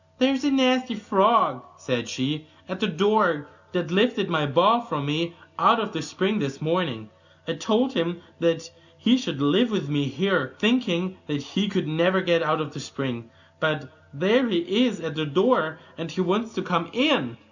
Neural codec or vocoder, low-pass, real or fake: none; 7.2 kHz; real